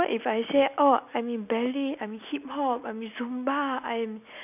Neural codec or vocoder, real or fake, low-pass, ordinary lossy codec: none; real; 3.6 kHz; none